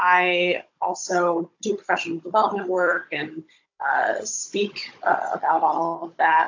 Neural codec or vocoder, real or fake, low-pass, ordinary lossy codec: codec, 16 kHz, 16 kbps, FunCodec, trained on Chinese and English, 50 frames a second; fake; 7.2 kHz; AAC, 48 kbps